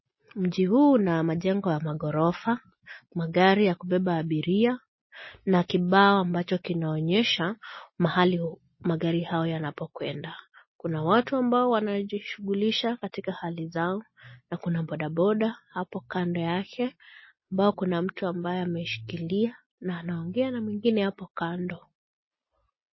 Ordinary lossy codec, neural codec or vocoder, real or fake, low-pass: MP3, 24 kbps; none; real; 7.2 kHz